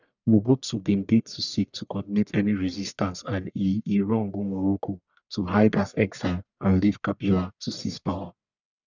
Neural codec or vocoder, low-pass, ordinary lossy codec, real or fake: codec, 44.1 kHz, 1.7 kbps, Pupu-Codec; 7.2 kHz; none; fake